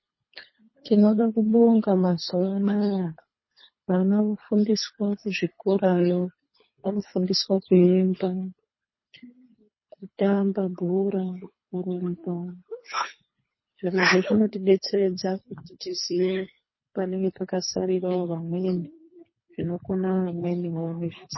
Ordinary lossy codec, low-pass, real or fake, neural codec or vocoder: MP3, 24 kbps; 7.2 kHz; fake; codec, 24 kHz, 3 kbps, HILCodec